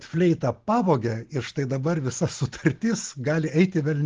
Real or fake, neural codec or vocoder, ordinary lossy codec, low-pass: real; none; Opus, 16 kbps; 7.2 kHz